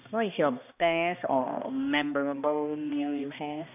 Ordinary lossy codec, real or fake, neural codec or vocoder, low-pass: none; fake; codec, 16 kHz, 1 kbps, X-Codec, HuBERT features, trained on balanced general audio; 3.6 kHz